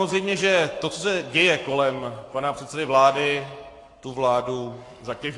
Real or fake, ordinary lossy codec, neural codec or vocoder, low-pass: fake; AAC, 48 kbps; codec, 44.1 kHz, 7.8 kbps, Pupu-Codec; 10.8 kHz